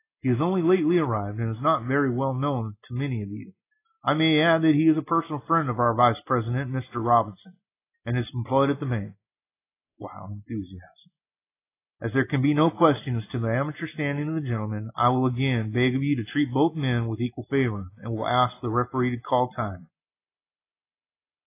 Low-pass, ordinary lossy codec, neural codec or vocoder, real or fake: 3.6 kHz; AAC, 24 kbps; none; real